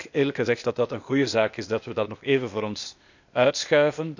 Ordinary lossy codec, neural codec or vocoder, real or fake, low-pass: none; codec, 16 kHz, 0.8 kbps, ZipCodec; fake; 7.2 kHz